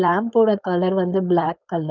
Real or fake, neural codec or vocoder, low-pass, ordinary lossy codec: fake; codec, 16 kHz, 4.8 kbps, FACodec; 7.2 kHz; none